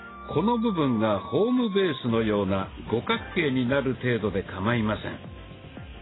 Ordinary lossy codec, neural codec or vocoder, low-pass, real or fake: AAC, 16 kbps; none; 7.2 kHz; real